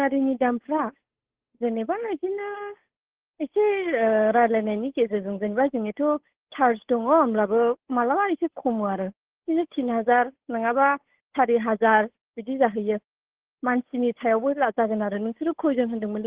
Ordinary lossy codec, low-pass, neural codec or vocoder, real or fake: Opus, 16 kbps; 3.6 kHz; codec, 16 kHz, 8 kbps, FunCodec, trained on Chinese and English, 25 frames a second; fake